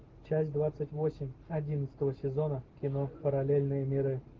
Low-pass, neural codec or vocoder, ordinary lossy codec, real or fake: 7.2 kHz; none; Opus, 16 kbps; real